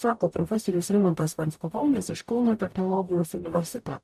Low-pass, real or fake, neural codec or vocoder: 14.4 kHz; fake; codec, 44.1 kHz, 0.9 kbps, DAC